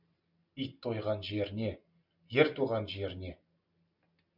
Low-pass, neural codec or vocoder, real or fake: 5.4 kHz; none; real